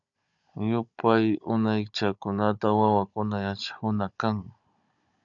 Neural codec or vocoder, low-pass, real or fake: codec, 16 kHz, 16 kbps, FunCodec, trained on Chinese and English, 50 frames a second; 7.2 kHz; fake